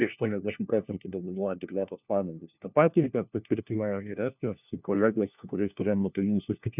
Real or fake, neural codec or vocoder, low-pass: fake; codec, 16 kHz, 1 kbps, FunCodec, trained on LibriTTS, 50 frames a second; 3.6 kHz